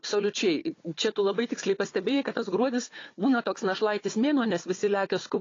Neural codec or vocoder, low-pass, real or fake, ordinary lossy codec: codec, 16 kHz, 4 kbps, FunCodec, trained on Chinese and English, 50 frames a second; 7.2 kHz; fake; AAC, 32 kbps